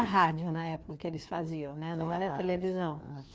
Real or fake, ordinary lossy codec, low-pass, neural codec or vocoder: fake; none; none; codec, 16 kHz, 2 kbps, FreqCodec, larger model